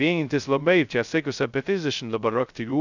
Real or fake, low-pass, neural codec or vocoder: fake; 7.2 kHz; codec, 16 kHz, 0.2 kbps, FocalCodec